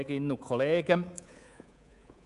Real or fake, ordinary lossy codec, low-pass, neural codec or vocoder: fake; none; 10.8 kHz; codec, 24 kHz, 3.1 kbps, DualCodec